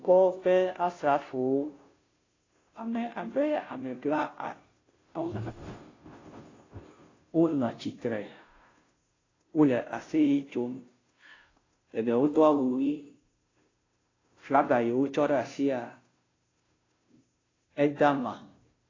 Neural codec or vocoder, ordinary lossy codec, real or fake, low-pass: codec, 16 kHz, 0.5 kbps, FunCodec, trained on Chinese and English, 25 frames a second; AAC, 32 kbps; fake; 7.2 kHz